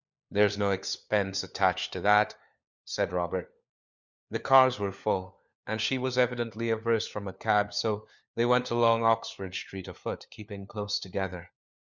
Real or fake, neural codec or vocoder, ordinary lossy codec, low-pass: fake; codec, 16 kHz, 4 kbps, FunCodec, trained on LibriTTS, 50 frames a second; Opus, 64 kbps; 7.2 kHz